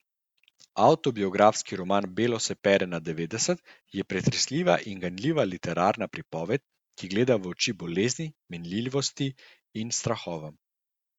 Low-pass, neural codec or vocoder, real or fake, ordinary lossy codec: 19.8 kHz; none; real; Opus, 64 kbps